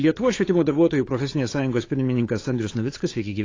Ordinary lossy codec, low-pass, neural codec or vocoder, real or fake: AAC, 32 kbps; 7.2 kHz; codec, 16 kHz, 8 kbps, FunCodec, trained on Chinese and English, 25 frames a second; fake